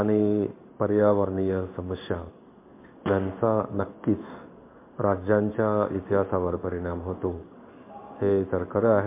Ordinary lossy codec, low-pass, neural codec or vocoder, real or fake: MP3, 24 kbps; 3.6 kHz; codec, 16 kHz in and 24 kHz out, 1 kbps, XY-Tokenizer; fake